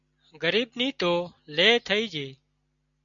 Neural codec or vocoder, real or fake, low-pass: none; real; 7.2 kHz